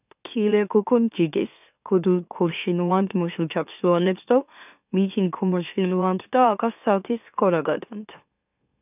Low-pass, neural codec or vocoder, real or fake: 3.6 kHz; autoencoder, 44.1 kHz, a latent of 192 numbers a frame, MeloTTS; fake